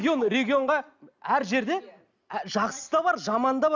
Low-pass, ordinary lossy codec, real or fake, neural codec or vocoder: 7.2 kHz; none; real; none